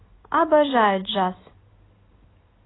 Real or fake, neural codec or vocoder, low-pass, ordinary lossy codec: real; none; 7.2 kHz; AAC, 16 kbps